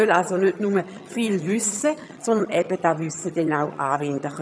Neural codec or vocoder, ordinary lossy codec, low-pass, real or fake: vocoder, 22.05 kHz, 80 mel bands, HiFi-GAN; none; none; fake